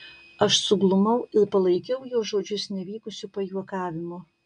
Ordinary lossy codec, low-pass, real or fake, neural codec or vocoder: MP3, 96 kbps; 9.9 kHz; real; none